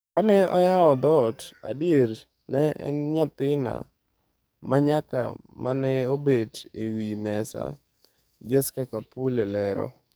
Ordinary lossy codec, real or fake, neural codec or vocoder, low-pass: none; fake; codec, 44.1 kHz, 2.6 kbps, SNAC; none